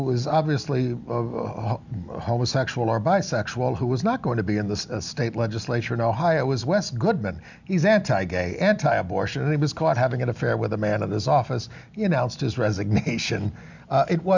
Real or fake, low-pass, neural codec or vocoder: real; 7.2 kHz; none